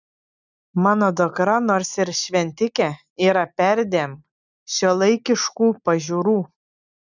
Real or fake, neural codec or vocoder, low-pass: real; none; 7.2 kHz